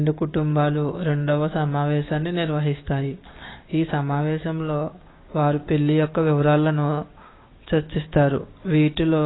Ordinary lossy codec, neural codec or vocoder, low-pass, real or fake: AAC, 16 kbps; codec, 16 kHz, 6 kbps, DAC; 7.2 kHz; fake